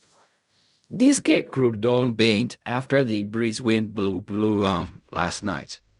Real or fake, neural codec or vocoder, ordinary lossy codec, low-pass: fake; codec, 16 kHz in and 24 kHz out, 0.4 kbps, LongCat-Audio-Codec, fine tuned four codebook decoder; none; 10.8 kHz